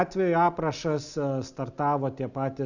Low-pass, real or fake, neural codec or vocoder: 7.2 kHz; real; none